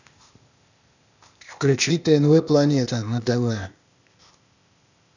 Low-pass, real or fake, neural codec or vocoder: 7.2 kHz; fake; codec, 16 kHz, 0.8 kbps, ZipCodec